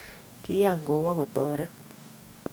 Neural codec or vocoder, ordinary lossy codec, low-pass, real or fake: codec, 44.1 kHz, 2.6 kbps, DAC; none; none; fake